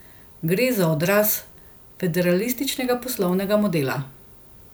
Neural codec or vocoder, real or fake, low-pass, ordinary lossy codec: none; real; none; none